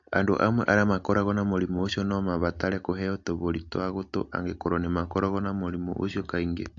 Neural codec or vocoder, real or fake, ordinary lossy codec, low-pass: none; real; AAC, 64 kbps; 7.2 kHz